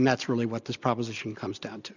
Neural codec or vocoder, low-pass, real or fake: none; 7.2 kHz; real